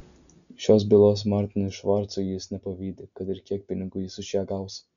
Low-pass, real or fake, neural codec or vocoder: 7.2 kHz; real; none